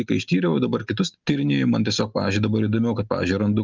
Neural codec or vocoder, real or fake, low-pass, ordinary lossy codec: none; real; 7.2 kHz; Opus, 24 kbps